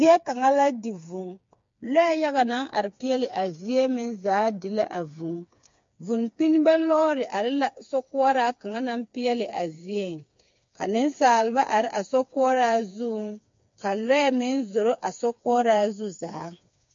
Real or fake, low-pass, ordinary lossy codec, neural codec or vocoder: fake; 7.2 kHz; MP3, 48 kbps; codec, 16 kHz, 4 kbps, FreqCodec, smaller model